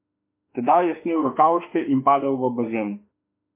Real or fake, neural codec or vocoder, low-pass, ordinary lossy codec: fake; autoencoder, 48 kHz, 32 numbers a frame, DAC-VAE, trained on Japanese speech; 3.6 kHz; MP3, 32 kbps